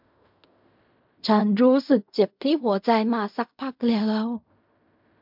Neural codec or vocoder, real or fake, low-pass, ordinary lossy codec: codec, 16 kHz in and 24 kHz out, 0.4 kbps, LongCat-Audio-Codec, fine tuned four codebook decoder; fake; 5.4 kHz; MP3, 48 kbps